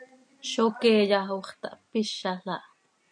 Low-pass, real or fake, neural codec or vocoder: 9.9 kHz; real; none